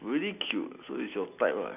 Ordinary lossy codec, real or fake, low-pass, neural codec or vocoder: none; real; 3.6 kHz; none